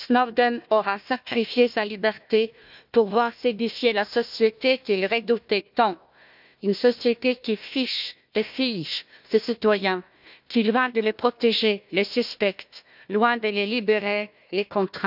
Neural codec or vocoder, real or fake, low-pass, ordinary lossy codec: codec, 16 kHz, 1 kbps, FunCodec, trained on Chinese and English, 50 frames a second; fake; 5.4 kHz; none